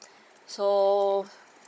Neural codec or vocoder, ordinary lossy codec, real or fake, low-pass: codec, 16 kHz, 16 kbps, FunCodec, trained on Chinese and English, 50 frames a second; none; fake; none